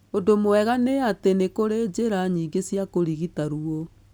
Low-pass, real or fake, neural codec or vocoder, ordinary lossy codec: none; real; none; none